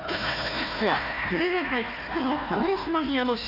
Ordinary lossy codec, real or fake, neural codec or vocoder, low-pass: none; fake; codec, 16 kHz, 1 kbps, FunCodec, trained on Chinese and English, 50 frames a second; 5.4 kHz